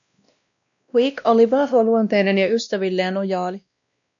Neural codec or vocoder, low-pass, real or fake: codec, 16 kHz, 1 kbps, X-Codec, WavLM features, trained on Multilingual LibriSpeech; 7.2 kHz; fake